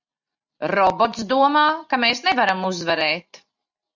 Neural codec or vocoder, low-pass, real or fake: none; 7.2 kHz; real